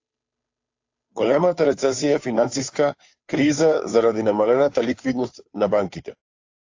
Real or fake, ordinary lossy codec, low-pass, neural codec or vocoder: fake; AAC, 48 kbps; 7.2 kHz; codec, 16 kHz, 2 kbps, FunCodec, trained on Chinese and English, 25 frames a second